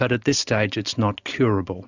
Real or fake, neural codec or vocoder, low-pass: real; none; 7.2 kHz